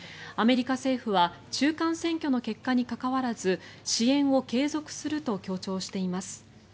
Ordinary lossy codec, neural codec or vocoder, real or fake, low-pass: none; none; real; none